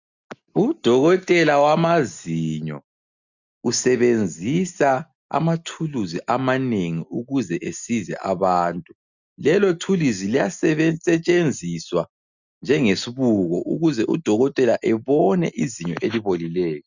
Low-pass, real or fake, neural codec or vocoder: 7.2 kHz; real; none